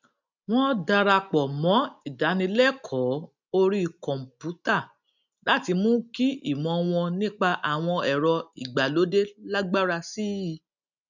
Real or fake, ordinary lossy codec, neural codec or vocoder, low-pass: real; none; none; 7.2 kHz